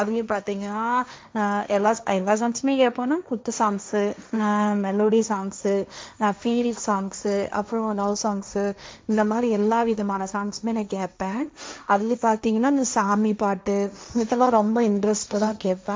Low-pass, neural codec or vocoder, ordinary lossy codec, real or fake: none; codec, 16 kHz, 1.1 kbps, Voila-Tokenizer; none; fake